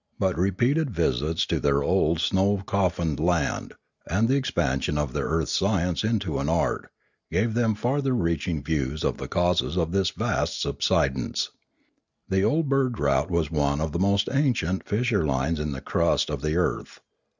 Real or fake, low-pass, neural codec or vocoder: real; 7.2 kHz; none